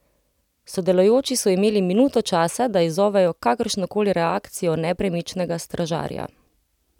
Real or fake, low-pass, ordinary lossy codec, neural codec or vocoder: real; 19.8 kHz; none; none